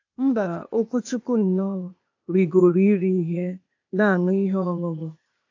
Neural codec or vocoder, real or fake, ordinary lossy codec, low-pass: codec, 16 kHz, 0.8 kbps, ZipCodec; fake; AAC, 48 kbps; 7.2 kHz